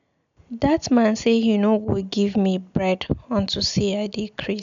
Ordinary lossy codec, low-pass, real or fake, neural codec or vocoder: MP3, 64 kbps; 7.2 kHz; real; none